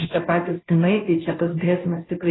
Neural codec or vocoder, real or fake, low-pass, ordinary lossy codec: codec, 16 kHz, 0.5 kbps, FunCodec, trained on Chinese and English, 25 frames a second; fake; 7.2 kHz; AAC, 16 kbps